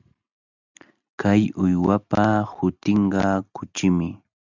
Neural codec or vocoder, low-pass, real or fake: none; 7.2 kHz; real